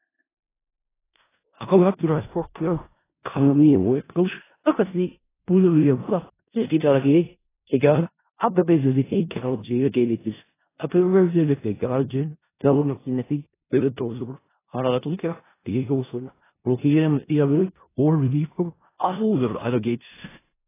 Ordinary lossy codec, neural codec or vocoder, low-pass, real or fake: AAC, 16 kbps; codec, 16 kHz in and 24 kHz out, 0.4 kbps, LongCat-Audio-Codec, four codebook decoder; 3.6 kHz; fake